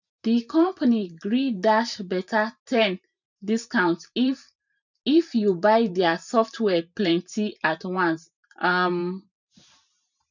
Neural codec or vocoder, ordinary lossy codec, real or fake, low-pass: vocoder, 44.1 kHz, 128 mel bands every 512 samples, BigVGAN v2; none; fake; 7.2 kHz